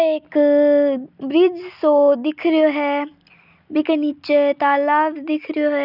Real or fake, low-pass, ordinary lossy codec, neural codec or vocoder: real; 5.4 kHz; none; none